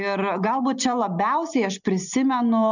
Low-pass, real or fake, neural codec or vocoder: 7.2 kHz; real; none